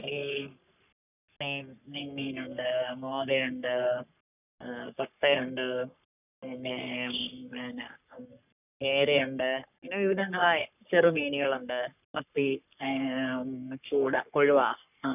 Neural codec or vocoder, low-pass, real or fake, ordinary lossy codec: codec, 44.1 kHz, 3.4 kbps, Pupu-Codec; 3.6 kHz; fake; none